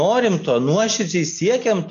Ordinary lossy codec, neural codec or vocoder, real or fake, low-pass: AAC, 48 kbps; none; real; 7.2 kHz